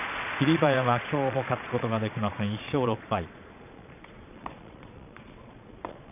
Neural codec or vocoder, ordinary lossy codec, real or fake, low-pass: vocoder, 22.05 kHz, 80 mel bands, Vocos; none; fake; 3.6 kHz